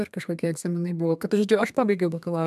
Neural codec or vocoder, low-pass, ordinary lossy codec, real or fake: codec, 32 kHz, 1.9 kbps, SNAC; 14.4 kHz; MP3, 96 kbps; fake